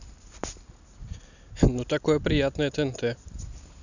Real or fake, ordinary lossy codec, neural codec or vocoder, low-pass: real; none; none; 7.2 kHz